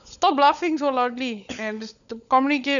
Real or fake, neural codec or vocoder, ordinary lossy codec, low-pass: fake; codec, 16 kHz, 16 kbps, FunCodec, trained on LibriTTS, 50 frames a second; none; 7.2 kHz